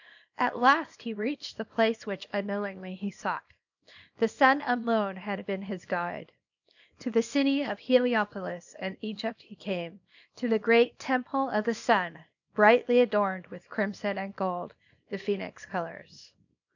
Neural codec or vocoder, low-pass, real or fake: codec, 24 kHz, 0.9 kbps, WavTokenizer, small release; 7.2 kHz; fake